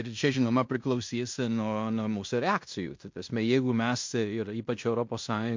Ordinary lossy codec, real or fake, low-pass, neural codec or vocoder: MP3, 48 kbps; fake; 7.2 kHz; codec, 16 kHz in and 24 kHz out, 0.9 kbps, LongCat-Audio-Codec, fine tuned four codebook decoder